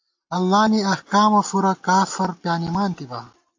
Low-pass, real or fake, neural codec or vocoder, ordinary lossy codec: 7.2 kHz; real; none; AAC, 48 kbps